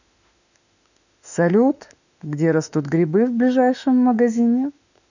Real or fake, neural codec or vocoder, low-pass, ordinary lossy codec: fake; autoencoder, 48 kHz, 32 numbers a frame, DAC-VAE, trained on Japanese speech; 7.2 kHz; none